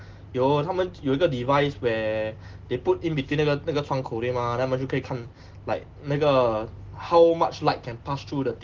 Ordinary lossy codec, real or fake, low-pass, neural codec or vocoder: Opus, 16 kbps; real; 7.2 kHz; none